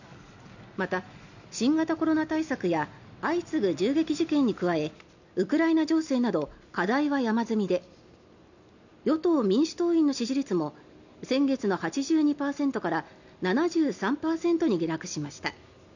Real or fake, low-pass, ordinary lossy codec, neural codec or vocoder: real; 7.2 kHz; none; none